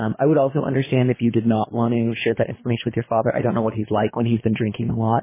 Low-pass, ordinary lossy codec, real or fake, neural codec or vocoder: 3.6 kHz; MP3, 16 kbps; fake; codec, 24 kHz, 3 kbps, HILCodec